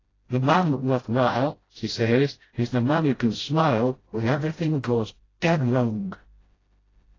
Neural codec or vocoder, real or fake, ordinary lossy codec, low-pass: codec, 16 kHz, 0.5 kbps, FreqCodec, smaller model; fake; AAC, 32 kbps; 7.2 kHz